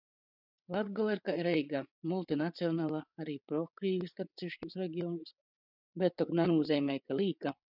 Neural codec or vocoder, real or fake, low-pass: codec, 16 kHz, 8 kbps, FreqCodec, larger model; fake; 5.4 kHz